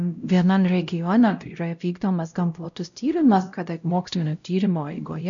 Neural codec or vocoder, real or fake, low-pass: codec, 16 kHz, 0.5 kbps, X-Codec, WavLM features, trained on Multilingual LibriSpeech; fake; 7.2 kHz